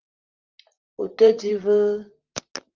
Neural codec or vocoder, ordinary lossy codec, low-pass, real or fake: vocoder, 44.1 kHz, 128 mel bands, Pupu-Vocoder; Opus, 32 kbps; 7.2 kHz; fake